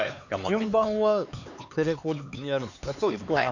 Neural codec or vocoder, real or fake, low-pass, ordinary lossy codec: codec, 16 kHz, 4 kbps, X-Codec, HuBERT features, trained on LibriSpeech; fake; 7.2 kHz; none